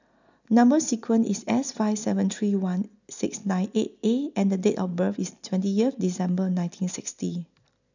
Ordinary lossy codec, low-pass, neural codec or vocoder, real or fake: none; 7.2 kHz; none; real